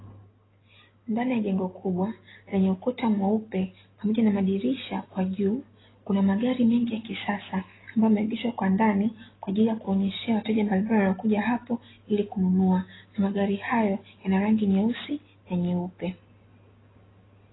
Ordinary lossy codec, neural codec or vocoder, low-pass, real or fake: AAC, 16 kbps; none; 7.2 kHz; real